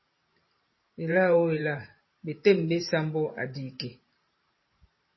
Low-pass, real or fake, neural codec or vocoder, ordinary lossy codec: 7.2 kHz; fake; vocoder, 44.1 kHz, 128 mel bands every 512 samples, BigVGAN v2; MP3, 24 kbps